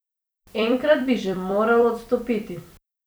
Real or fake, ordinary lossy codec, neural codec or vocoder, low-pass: fake; none; vocoder, 44.1 kHz, 128 mel bands every 256 samples, BigVGAN v2; none